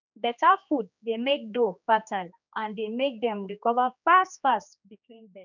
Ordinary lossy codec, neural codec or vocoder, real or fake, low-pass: none; codec, 16 kHz, 2 kbps, X-Codec, HuBERT features, trained on general audio; fake; 7.2 kHz